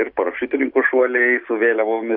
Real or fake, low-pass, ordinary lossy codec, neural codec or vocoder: real; 5.4 kHz; AAC, 48 kbps; none